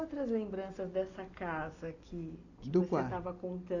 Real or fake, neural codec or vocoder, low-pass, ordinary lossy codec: real; none; 7.2 kHz; none